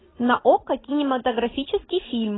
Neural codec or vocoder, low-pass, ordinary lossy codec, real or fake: none; 7.2 kHz; AAC, 16 kbps; real